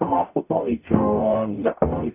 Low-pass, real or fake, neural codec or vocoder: 3.6 kHz; fake; codec, 44.1 kHz, 0.9 kbps, DAC